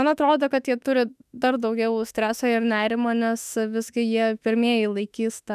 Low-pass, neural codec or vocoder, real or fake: 14.4 kHz; autoencoder, 48 kHz, 32 numbers a frame, DAC-VAE, trained on Japanese speech; fake